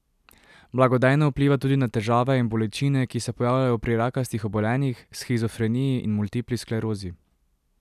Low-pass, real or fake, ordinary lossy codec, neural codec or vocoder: 14.4 kHz; real; none; none